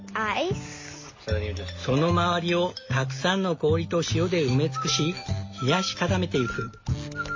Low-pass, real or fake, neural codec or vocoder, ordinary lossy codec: 7.2 kHz; real; none; MP3, 32 kbps